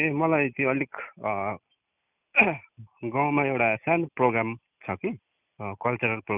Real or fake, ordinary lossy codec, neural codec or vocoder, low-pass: real; none; none; 3.6 kHz